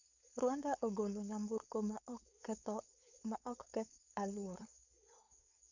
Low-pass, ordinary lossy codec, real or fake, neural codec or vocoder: 7.2 kHz; none; fake; codec, 16 kHz, 4.8 kbps, FACodec